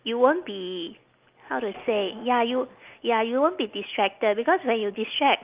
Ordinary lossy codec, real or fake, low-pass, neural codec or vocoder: Opus, 24 kbps; real; 3.6 kHz; none